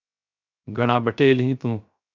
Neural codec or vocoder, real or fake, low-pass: codec, 16 kHz, 0.3 kbps, FocalCodec; fake; 7.2 kHz